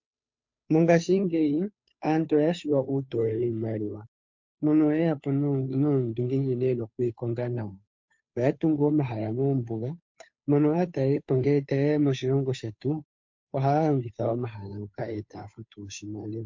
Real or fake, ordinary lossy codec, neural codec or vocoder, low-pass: fake; MP3, 48 kbps; codec, 16 kHz, 2 kbps, FunCodec, trained on Chinese and English, 25 frames a second; 7.2 kHz